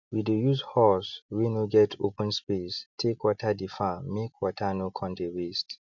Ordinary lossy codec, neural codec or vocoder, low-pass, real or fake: none; none; 7.2 kHz; real